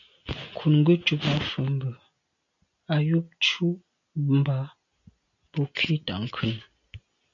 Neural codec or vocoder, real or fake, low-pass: none; real; 7.2 kHz